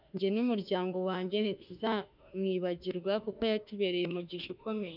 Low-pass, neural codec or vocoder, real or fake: 5.4 kHz; autoencoder, 48 kHz, 32 numbers a frame, DAC-VAE, trained on Japanese speech; fake